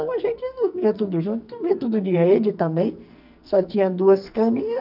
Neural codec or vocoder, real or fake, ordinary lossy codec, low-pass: codec, 44.1 kHz, 2.6 kbps, SNAC; fake; none; 5.4 kHz